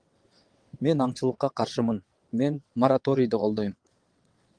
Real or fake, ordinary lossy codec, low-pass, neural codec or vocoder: fake; Opus, 24 kbps; 9.9 kHz; vocoder, 22.05 kHz, 80 mel bands, WaveNeXt